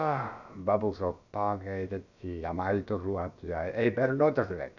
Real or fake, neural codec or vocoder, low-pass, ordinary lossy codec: fake; codec, 16 kHz, about 1 kbps, DyCAST, with the encoder's durations; 7.2 kHz; none